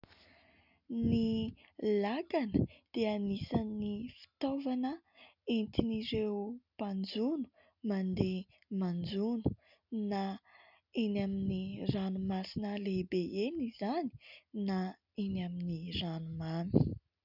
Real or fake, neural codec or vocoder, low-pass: real; none; 5.4 kHz